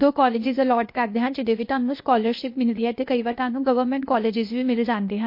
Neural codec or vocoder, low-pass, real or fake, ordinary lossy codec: codec, 16 kHz, 0.8 kbps, ZipCodec; 5.4 kHz; fake; MP3, 32 kbps